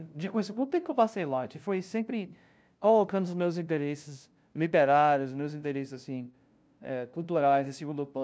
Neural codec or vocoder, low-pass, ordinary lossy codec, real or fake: codec, 16 kHz, 0.5 kbps, FunCodec, trained on LibriTTS, 25 frames a second; none; none; fake